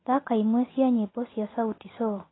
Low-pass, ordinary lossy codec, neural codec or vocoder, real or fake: 7.2 kHz; AAC, 16 kbps; none; real